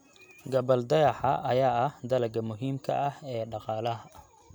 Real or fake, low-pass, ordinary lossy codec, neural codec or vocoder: real; none; none; none